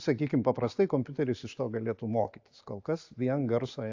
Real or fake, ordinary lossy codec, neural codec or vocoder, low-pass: real; AAC, 48 kbps; none; 7.2 kHz